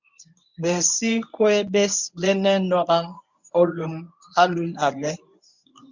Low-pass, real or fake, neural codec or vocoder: 7.2 kHz; fake; codec, 24 kHz, 0.9 kbps, WavTokenizer, medium speech release version 1